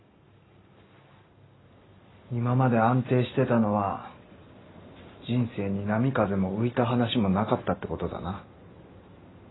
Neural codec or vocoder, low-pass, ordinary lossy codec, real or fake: none; 7.2 kHz; AAC, 16 kbps; real